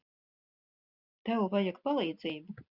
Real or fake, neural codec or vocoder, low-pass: real; none; 5.4 kHz